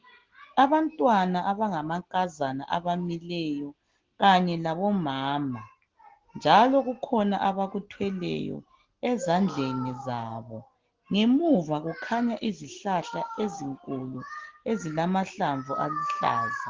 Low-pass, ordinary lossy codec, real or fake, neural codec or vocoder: 7.2 kHz; Opus, 16 kbps; real; none